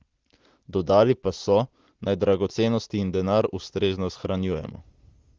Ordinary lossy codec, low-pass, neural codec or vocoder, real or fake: Opus, 16 kbps; 7.2 kHz; none; real